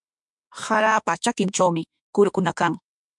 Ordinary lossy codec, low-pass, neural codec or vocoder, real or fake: MP3, 96 kbps; 10.8 kHz; autoencoder, 48 kHz, 32 numbers a frame, DAC-VAE, trained on Japanese speech; fake